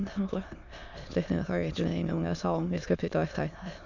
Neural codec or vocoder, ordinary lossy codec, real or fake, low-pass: autoencoder, 22.05 kHz, a latent of 192 numbers a frame, VITS, trained on many speakers; none; fake; 7.2 kHz